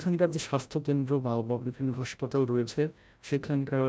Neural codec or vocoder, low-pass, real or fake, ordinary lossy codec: codec, 16 kHz, 0.5 kbps, FreqCodec, larger model; none; fake; none